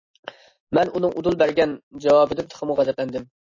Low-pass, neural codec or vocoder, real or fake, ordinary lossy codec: 7.2 kHz; none; real; MP3, 32 kbps